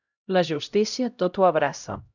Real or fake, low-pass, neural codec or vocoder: fake; 7.2 kHz; codec, 16 kHz, 0.5 kbps, X-Codec, HuBERT features, trained on LibriSpeech